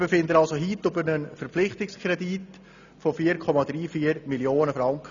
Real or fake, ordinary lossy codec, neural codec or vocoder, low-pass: real; none; none; 7.2 kHz